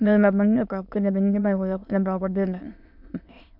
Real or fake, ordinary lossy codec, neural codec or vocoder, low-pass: fake; MP3, 48 kbps; autoencoder, 22.05 kHz, a latent of 192 numbers a frame, VITS, trained on many speakers; 5.4 kHz